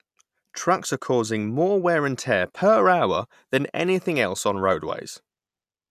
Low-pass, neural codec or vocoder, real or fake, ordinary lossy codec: 14.4 kHz; none; real; none